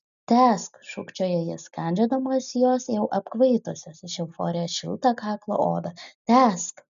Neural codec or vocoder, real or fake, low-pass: none; real; 7.2 kHz